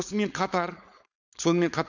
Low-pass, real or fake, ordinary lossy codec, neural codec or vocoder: 7.2 kHz; fake; none; codec, 16 kHz, 4.8 kbps, FACodec